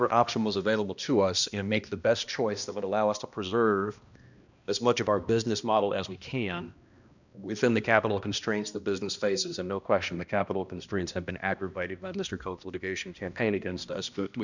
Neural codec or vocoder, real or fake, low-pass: codec, 16 kHz, 1 kbps, X-Codec, HuBERT features, trained on balanced general audio; fake; 7.2 kHz